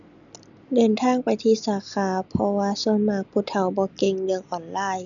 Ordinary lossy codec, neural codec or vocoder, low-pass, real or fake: none; none; 7.2 kHz; real